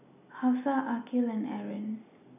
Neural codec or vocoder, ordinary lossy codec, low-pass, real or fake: none; none; 3.6 kHz; real